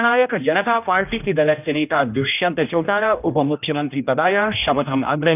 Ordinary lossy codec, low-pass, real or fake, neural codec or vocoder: none; 3.6 kHz; fake; codec, 16 kHz, 1 kbps, X-Codec, HuBERT features, trained on general audio